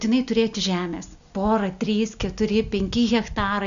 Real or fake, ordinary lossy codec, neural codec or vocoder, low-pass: real; MP3, 96 kbps; none; 7.2 kHz